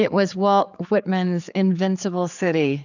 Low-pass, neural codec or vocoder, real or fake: 7.2 kHz; codec, 16 kHz, 4 kbps, X-Codec, HuBERT features, trained on general audio; fake